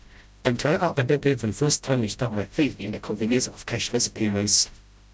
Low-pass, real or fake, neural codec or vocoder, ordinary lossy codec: none; fake; codec, 16 kHz, 0.5 kbps, FreqCodec, smaller model; none